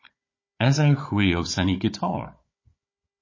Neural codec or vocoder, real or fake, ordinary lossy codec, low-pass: codec, 16 kHz, 16 kbps, FunCodec, trained on Chinese and English, 50 frames a second; fake; MP3, 32 kbps; 7.2 kHz